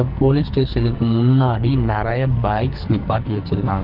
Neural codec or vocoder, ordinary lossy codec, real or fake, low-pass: codec, 44.1 kHz, 2.6 kbps, SNAC; Opus, 24 kbps; fake; 5.4 kHz